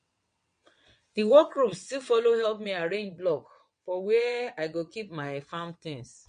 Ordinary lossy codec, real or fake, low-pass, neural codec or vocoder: MP3, 48 kbps; fake; 9.9 kHz; vocoder, 22.05 kHz, 80 mel bands, WaveNeXt